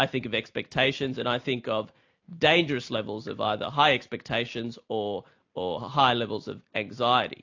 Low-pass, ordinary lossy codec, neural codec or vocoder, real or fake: 7.2 kHz; AAC, 48 kbps; none; real